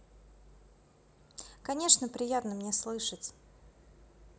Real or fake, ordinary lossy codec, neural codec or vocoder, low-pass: real; none; none; none